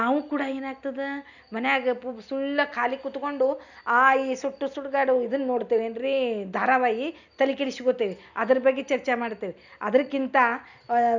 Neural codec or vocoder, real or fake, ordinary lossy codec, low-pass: none; real; none; 7.2 kHz